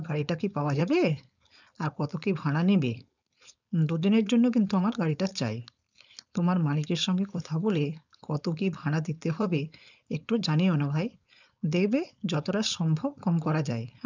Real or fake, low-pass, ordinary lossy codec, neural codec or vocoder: fake; 7.2 kHz; none; codec, 16 kHz, 4.8 kbps, FACodec